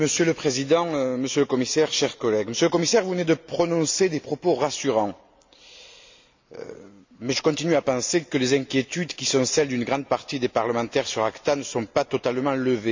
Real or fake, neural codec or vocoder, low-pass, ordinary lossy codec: real; none; 7.2 kHz; MP3, 64 kbps